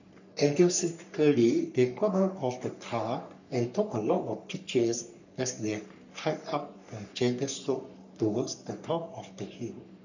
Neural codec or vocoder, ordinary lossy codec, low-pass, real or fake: codec, 44.1 kHz, 3.4 kbps, Pupu-Codec; none; 7.2 kHz; fake